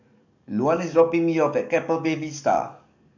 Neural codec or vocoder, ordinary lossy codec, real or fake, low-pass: codec, 44.1 kHz, 7.8 kbps, DAC; none; fake; 7.2 kHz